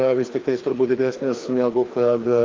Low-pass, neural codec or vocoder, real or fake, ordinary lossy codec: 7.2 kHz; codec, 16 kHz, 2 kbps, FreqCodec, larger model; fake; Opus, 32 kbps